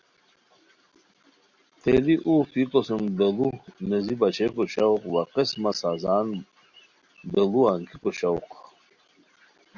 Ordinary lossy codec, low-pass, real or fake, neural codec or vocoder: Opus, 64 kbps; 7.2 kHz; real; none